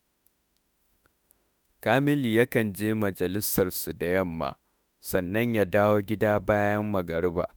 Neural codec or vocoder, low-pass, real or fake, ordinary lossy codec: autoencoder, 48 kHz, 32 numbers a frame, DAC-VAE, trained on Japanese speech; none; fake; none